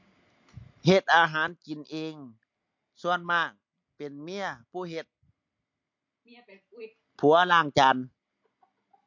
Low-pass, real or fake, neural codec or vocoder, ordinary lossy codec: 7.2 kHz; real; none; MP3, 48 kbps